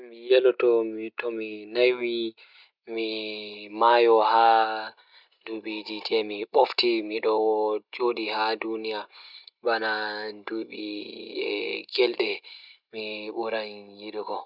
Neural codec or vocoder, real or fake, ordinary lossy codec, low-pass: none; real; none; 5.4 kHz